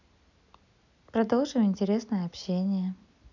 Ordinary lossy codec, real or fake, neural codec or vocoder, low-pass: none; real; none; 7.2 kHz